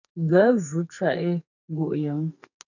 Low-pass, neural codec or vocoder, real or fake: 7.2 kHz; codec, 44.1 kHz, 2.6 kbps, SNAC; fake